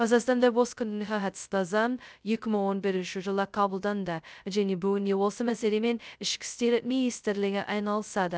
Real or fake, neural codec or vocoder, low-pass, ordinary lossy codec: fake; codec, 16 kHz, 0.2 kbps, FocalCodec; none; none